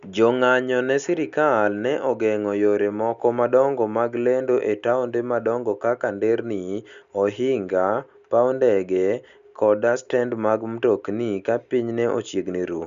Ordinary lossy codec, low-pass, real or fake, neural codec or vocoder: Opus, 64 kbps; 7.2 kHz; real; none